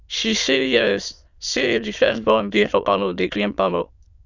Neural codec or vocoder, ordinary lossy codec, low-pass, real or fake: autoencoder, 22.05 kHz, a latent of 192 numbers a frame, VITS, trained on many speakers; none; 7.2 kHz; fake